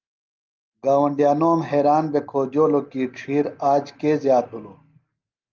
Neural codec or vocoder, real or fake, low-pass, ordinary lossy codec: none; real; 7.2 kHz; Opus, 24 kbps